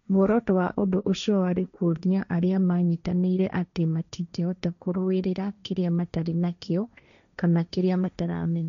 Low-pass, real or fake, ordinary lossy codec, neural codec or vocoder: 7.2 kHz; fake; none; codec, 16 kHz, 1.1 kbps, Voila-Tokenizer